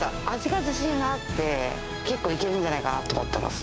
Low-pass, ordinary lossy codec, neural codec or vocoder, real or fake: none; none; codec, 16 kHz, 6 kbps, DAC; fake